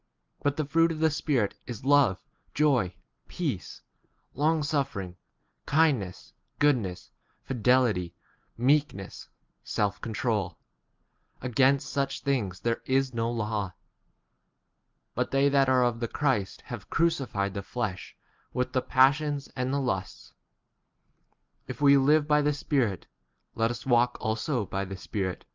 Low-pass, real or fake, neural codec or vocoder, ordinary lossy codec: 7.2 kHz; real; none; Opus, 24 kbps